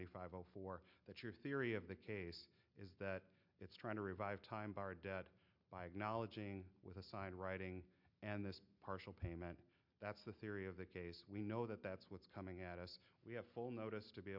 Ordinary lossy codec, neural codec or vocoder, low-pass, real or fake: MP3, 48 kbps; none; 5.4 kHz; real